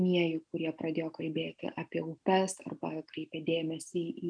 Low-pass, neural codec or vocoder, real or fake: 9.9 kHz; none; real